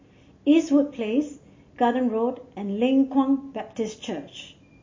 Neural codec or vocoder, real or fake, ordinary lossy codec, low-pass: none; real; MP3, 32 kbps; 7.2 kHz